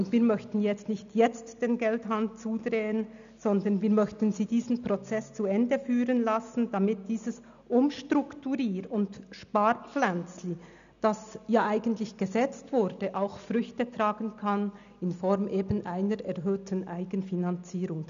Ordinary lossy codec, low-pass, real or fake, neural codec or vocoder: none; 7.2 kHz; real; none